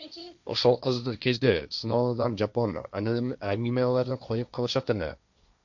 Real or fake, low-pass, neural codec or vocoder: fake; 7.2 kHz; codec, 16 kHz, 1.1 kbps, Voila-Tokenizer